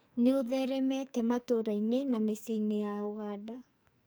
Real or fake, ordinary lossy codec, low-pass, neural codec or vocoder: fake; none; none; codec, 44.1 kHz, 2.6 kbps, SNAC